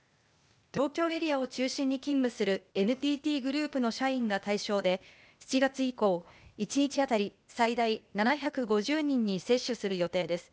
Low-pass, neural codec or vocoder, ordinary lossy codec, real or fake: none; codec, 16 kHz, 0.8 kbps, ZipCodec; none; fake